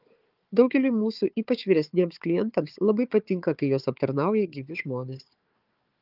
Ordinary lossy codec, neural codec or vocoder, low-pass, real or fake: Opus, 32 kbps; codec, 16 kHz, 4 kbps, FunCodec, trained on Chinese and English, 50 frames a second; 5.4 kHz; fake